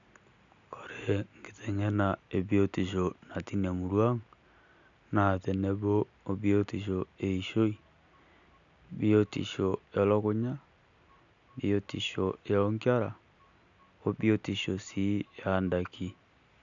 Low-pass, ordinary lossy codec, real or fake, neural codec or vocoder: 7.2 kHz; none; real; none